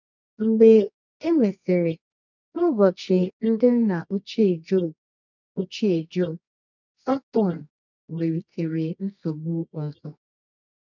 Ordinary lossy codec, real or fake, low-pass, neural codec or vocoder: none; fake; 7.2 kHz; codec, 24 kHz, 0.9 kbps, WavTokenizer, medium music audio release